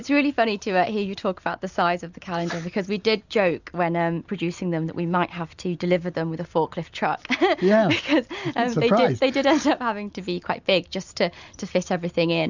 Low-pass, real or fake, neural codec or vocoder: 7.2 kHz; real; none